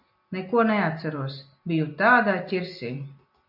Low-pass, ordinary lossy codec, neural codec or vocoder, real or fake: 5.4 kHz; MP3, 48 kbps; none; real